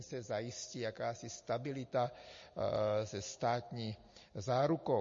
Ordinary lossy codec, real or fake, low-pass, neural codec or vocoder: MP3, 32 kbps; real; 7.2 kHz; none